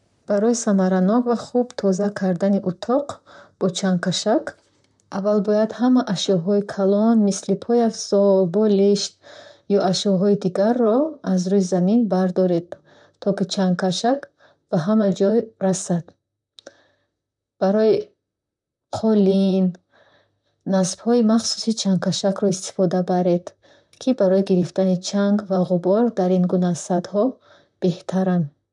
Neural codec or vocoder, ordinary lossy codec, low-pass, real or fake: vocoder, 44.1 kHz, 128 mel bands, Pupu-Vocoder; none; 10.8 kHz; fake